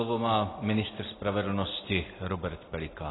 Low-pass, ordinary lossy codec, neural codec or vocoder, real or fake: 7.2 kHz; AAC, 16 kbps; none; real